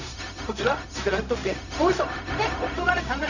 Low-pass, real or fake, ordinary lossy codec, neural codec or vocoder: 7.2 kHz; fake; none; codec, 16 kHz, 0.4 kbps, LongCat-Audio-Codec